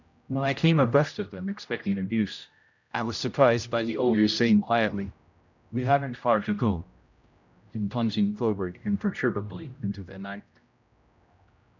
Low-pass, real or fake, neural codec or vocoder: 7.2 kHz; fake; codec, 16 kHz, 0.5 kbps, X-Codec, HuBERT features, trained on general audio